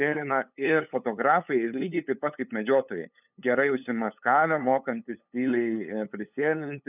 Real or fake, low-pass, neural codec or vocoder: fake; 3.6 kHz; codec, 16 kHz, 8 kbps, FunCodec, trained on LibriTTS, 25 frames a second